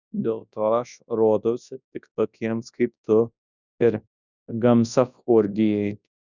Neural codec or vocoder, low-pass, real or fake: codec, 24 kHz, 0.9 kbps, WavTokenizer, large speech release; 7.2 kHz; fake